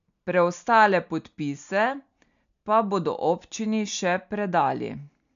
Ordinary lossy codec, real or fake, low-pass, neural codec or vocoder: AAC, 96 kbps; real; 7.2 kHz; none